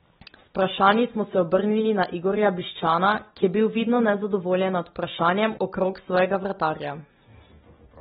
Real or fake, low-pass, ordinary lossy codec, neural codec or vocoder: real; 7.2 kHz; AAC, 16 kbps; none